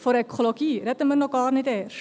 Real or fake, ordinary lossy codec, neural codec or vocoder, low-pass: real; none; none; none